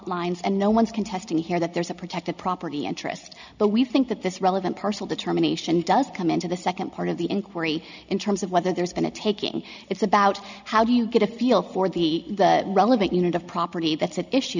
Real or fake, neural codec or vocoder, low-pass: real; none; 7.2 kHz